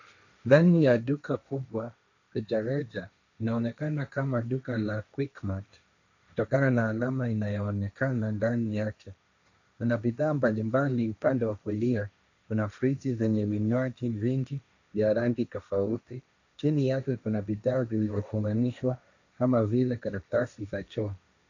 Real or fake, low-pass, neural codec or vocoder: fake; 7.2 kHz; codec, 16 kHz, 1.1 kbps, Voila-Tokenizer